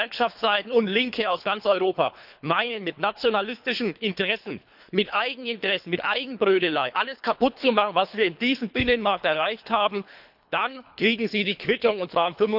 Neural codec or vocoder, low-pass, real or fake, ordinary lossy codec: codec, 24 kHz, 3 kbps, HILCodec; 5.4 kHz; fake; none